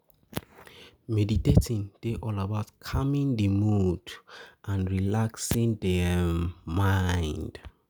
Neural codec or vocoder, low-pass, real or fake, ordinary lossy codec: none; none; real; none